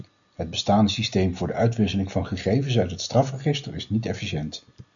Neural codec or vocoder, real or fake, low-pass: none; real; 7.2 kHz